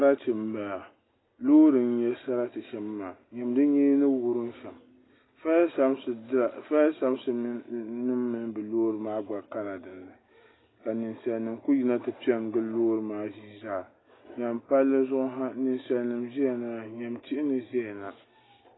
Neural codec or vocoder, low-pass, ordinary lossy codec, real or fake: none; 7.2 kHz; AAC, 16 kbps; real